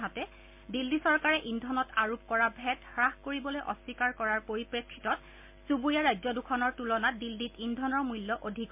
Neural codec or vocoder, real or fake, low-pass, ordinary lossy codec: none; real; 3.6 kHz; MP3, 32 kbps